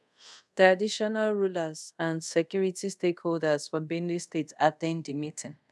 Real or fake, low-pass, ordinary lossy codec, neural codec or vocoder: fake; none; none; codec, 24 kHz, 0.5 kbps, DualCodec